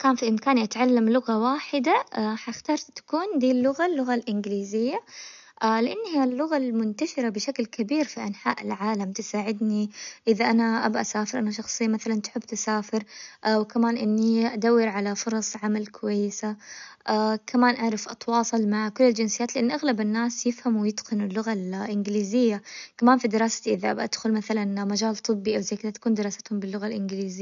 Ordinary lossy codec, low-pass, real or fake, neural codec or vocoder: none; 7.2 kHz; real; none